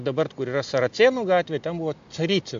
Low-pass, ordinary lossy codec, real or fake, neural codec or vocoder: 7.2 kHz; AAC, 64 kbps; real; none